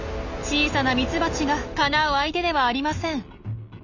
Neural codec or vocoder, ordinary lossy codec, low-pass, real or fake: none; none; 7.2 kHz; real